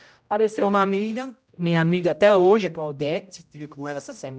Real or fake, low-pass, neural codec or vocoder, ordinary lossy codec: fake; none; codec, 16 kHz, 0.5 kbps, X-Codec, HuBERT features, trained on general audio; none